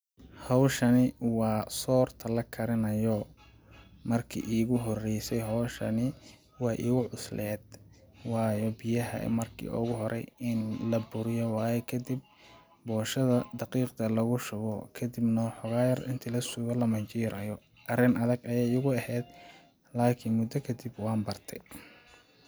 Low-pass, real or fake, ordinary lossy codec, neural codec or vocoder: none; real; none; none